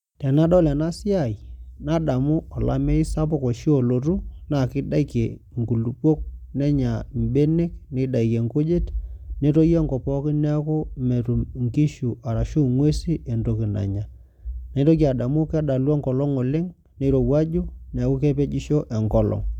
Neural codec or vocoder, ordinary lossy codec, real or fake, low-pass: none; none; real; 19.8 kHz